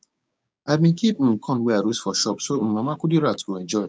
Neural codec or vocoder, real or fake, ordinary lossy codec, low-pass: codec, 16 kHz, 6 kbps, DAC; fake; none; none